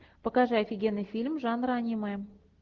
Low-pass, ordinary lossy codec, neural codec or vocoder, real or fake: 7.2 kHz; Opus, 16 kbps; none; real